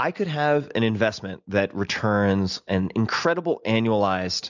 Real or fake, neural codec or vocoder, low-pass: real; none; 7.2 kHz